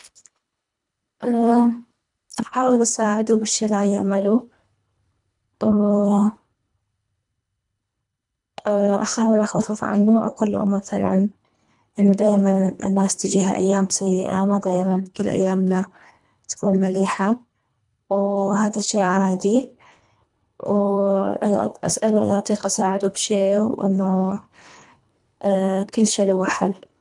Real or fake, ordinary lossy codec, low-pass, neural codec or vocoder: fake; none; 10.8 kHz; codec, 24 kHz, 1.5 kbps, HILCodec